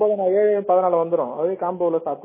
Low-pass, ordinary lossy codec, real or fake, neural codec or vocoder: 3.6 kHz; MP3, 16 kbps; real; none